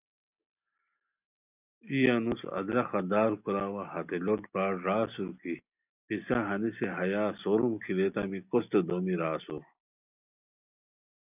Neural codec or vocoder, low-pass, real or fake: none; 3.6 kHz; real